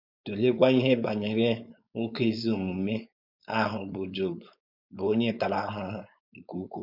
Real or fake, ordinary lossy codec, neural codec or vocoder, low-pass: fake; none; codec, 16 kHz, 4.8 kbps, FACodec; 5.4 kHz